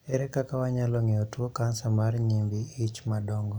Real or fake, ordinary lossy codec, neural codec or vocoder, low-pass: real; none; none; none